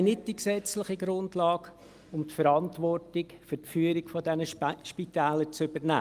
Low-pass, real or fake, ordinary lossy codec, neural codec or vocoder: 14.4 kHz; real; Opus, 32 kbps; none